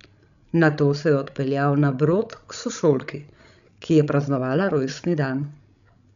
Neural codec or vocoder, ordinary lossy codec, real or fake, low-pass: codec, 16 kHz, 16 kbps, FreqCodec, larger model; none; fake; 7.2 kHz